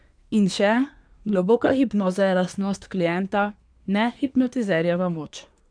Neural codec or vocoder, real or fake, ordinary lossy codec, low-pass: codec, 24 kHz, 1 kbps, SNAC; fake; none; 9.9 kHz